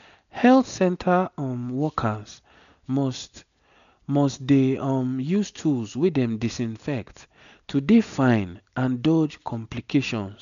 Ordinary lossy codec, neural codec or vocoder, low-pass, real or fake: MP3, 96 kbps; none; 7.2 kHz; real